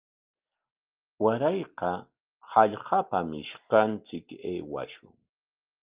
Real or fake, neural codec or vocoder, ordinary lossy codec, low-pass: real; none; Opus, 32 kbps; 3.6 kHz